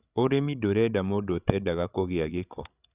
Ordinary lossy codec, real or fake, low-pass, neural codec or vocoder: none; fake; 3.6 kHz; codec, 16 kHz, 16 kbps, FreqCodec, larger model